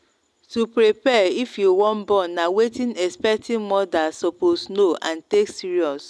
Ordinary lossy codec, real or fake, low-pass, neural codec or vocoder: none; real; none; none